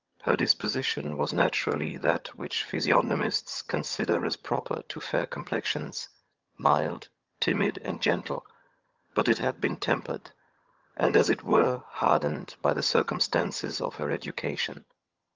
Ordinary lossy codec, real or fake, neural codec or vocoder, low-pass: Opus, 24 kbps; fake; vocoder, 22.05 kHz, 80 mel bands, HiFi-GAN; 7.2 kHz